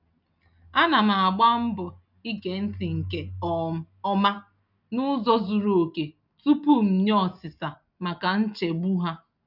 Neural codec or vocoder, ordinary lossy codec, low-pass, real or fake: none; none; 5.4 kHz; real